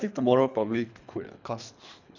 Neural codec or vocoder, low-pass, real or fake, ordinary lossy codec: codec, 24 kHz, 3 kbps, HILCodec; 7.2 kHz; fake; none